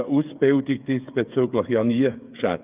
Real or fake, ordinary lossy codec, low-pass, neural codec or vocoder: fake; Opus, 24 kbps; 3.6 kHz; codec, 16 kHz, 8 kbps, FreqCodec, smaller model